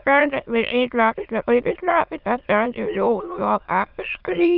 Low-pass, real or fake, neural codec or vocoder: 5.4 kHz; fake; autoencoder, 22.05 kHz, a latent of 192 numbers a frame, VITS, trained on many speakers